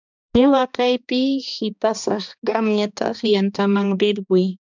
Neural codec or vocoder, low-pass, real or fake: codec, 16 kHz, 2 kbps, X-Codec, HuBERT features, trained on balanced general audio; 7.2 kHz; fake